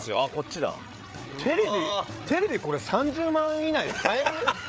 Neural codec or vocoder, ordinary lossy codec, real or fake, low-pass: codec, 16 kHz, 8 kbps, FreqCodec, larger model; none; fake; none